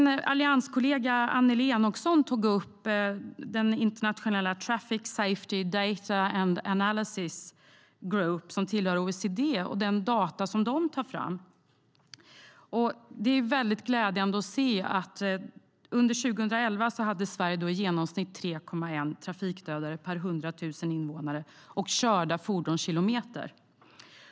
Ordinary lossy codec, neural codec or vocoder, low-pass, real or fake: none; none; none; real